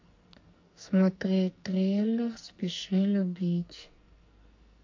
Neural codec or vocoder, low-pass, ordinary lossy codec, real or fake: codec, 44.1 kHz, 2.6 kbps, SNAC; 7.2 kHz; MP3, 48 kbps; fake